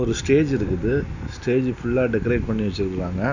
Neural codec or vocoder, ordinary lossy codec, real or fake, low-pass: none; none; real; 7.2 kHz